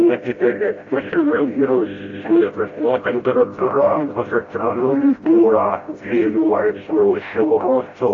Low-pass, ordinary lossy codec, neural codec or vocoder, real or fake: 7.2 kHz; AAC, 32 kbps; codec, 16 kHz, 0.5 kbps, FreqCodec, smaller model; fake